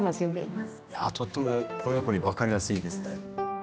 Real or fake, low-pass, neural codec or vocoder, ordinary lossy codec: fake; none; codec, 16 kHz, 1 kbps, X-Codec, HuBERT features, trained on general audio; none